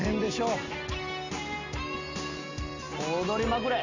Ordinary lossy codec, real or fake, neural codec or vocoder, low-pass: AAC, 48 kbps; real; none; 7.2 kHz